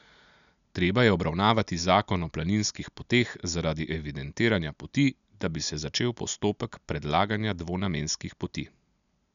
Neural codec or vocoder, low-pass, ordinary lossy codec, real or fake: none; 7.2 kHz; none; real